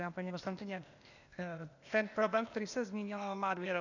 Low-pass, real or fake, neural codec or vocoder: 7.2 kHz; fake; codec, 16 kHz, 0.8 kbps, ZipCodec